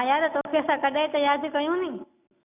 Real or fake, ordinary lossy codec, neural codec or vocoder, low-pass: real; none; none; 3.6 kHz